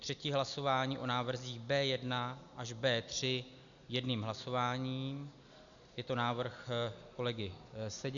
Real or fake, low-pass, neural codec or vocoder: real; 7.2 kHz; none